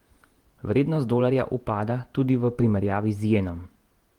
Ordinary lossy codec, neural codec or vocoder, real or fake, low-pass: Opus, 24 kbps; vocoder, 48 kHz, 128 mel bands, Vocos; fake; 19.8 kHz